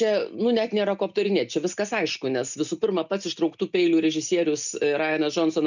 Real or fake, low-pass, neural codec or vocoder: real; 7.2 kHz; none